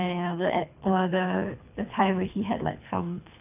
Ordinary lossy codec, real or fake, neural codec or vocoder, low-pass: none; fake; codec, 24 kHz, 3 kbps, HILCodec; 3.6 kHz